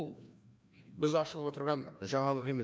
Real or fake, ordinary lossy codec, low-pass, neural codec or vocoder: fake; none; none; codec, 16 kHz, 1 kbps, FreqCodec, larger model